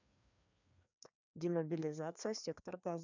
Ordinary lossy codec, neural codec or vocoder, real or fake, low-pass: none; codec, 16 kHz, 2 kbps, FreqCodec, larger model; fake; 7.2 kHz